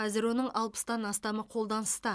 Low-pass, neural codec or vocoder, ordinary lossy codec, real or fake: none; none; none; real